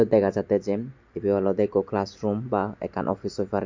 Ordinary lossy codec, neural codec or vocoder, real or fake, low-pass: MP3, 48 kbps; none; real; 7.2 kHz